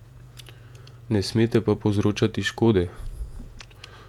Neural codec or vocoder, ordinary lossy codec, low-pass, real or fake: vocoder, 48 kHz, 128 mel bands, Vocos; MP3, 96 kbps; 19.8 kHz; fake